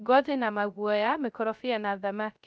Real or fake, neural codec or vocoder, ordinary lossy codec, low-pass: fake; codec, 16 kHz, 0.3 kbps, FocalCodec; none; none